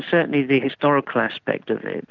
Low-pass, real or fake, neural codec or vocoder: 7.2 kHz; real; none